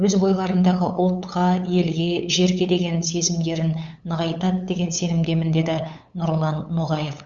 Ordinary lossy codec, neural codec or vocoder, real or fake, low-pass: Opus, 64 kbps; codec, 16 kHz, 8 kbps, FunCodec, trained on LibriTTS, 25 frames a second; fake; 7.2 kHz